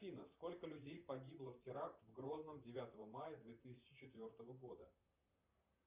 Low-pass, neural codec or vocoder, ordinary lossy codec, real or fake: 3.6 kHz; vocoder, 44.1 kHz, 128 mel bands, Pupu-Vocoder; Opus, 64 kbps; fake